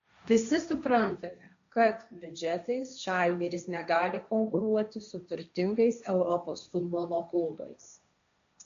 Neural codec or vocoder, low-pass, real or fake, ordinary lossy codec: codec, 16 kHz, 1.1 kbps, Voila-Tokenizer; 7.2 kHz; fake; AAC, 64 kbps